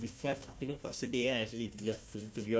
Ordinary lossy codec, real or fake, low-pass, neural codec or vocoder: none; fake; none; codec, 16 kHz, 1 kbps, FunCodec, trained on Chinese and English, 50 frames a second